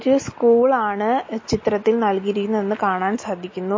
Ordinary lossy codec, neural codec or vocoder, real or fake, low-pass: MP3, 32 kbps; none; real; 7.2 kHz